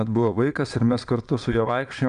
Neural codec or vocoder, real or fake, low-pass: vocoder, 22.05 kHz, 80 mel bands, Vocos; fake; 9.9 kHz